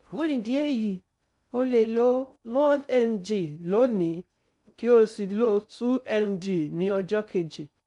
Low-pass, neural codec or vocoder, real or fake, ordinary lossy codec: 10.8 kHz; codec, 16 kHz in and 24 kHz out, 0.6 kbps, FocalCodec, streaming, 2048 codes; fake; none